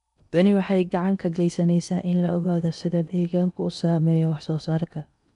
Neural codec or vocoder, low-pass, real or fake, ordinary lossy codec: codec, 16 kHz in and 24 kHz out, 0.8 kbps, FocalCodec, streaming, 65536 codes; 10.8 kHz; fake; none